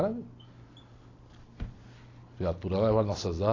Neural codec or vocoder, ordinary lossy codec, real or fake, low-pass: none; AAC, 32 kbps; real; 7.2 kHz